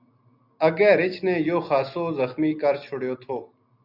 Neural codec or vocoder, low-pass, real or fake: none; 5.4 kHz; real